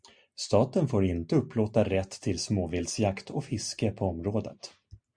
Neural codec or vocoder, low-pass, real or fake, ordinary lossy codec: none; 9.9 kHz; real; MP3, 96 kbps